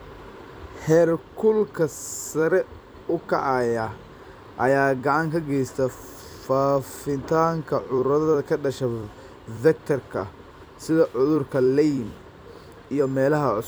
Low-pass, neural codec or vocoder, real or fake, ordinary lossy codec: none; vocoder, 44.1 kHz, 128 mel bands every 256 samples, BigVGAN v2; fake; none